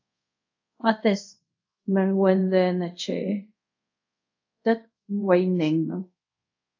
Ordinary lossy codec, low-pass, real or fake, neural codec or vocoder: AAC, 48 kbps; 7.2 kHz; fake; codec, 24 kHz, 0.5 kbps, DualCodec